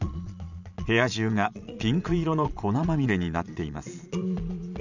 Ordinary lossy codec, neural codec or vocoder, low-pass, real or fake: none; vocoder, 22.05 kHz, 80 mel bands, Vocos; 7.2 kHz; fake